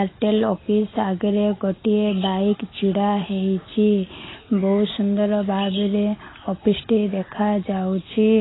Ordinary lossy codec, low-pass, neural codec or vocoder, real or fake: AAC, 16 kbps; 7.2 kHz; none; real